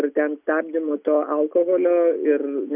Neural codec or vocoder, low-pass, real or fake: none; 3.6 kHz; real